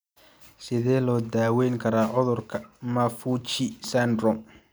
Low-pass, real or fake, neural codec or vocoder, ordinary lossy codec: none; real; none; none